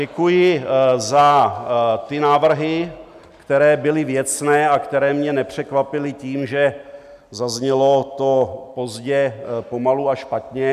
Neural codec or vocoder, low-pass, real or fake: none; 14.4 kHz; real